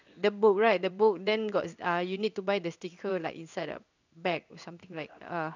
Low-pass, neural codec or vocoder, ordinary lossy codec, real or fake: 7.2 kHz; codec, 16 kHz in and 24 kHz out, 1 kbps, XY-Tokenizer; none; fake